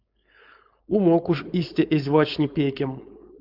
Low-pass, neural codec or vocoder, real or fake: 5.4 kHz; codec, 16 kHz, 4.8 kbps, FACodec; fake